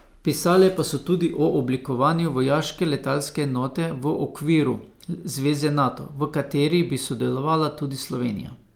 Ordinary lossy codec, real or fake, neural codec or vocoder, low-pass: Opus, 32 kbps; real; none; 19.8 kHz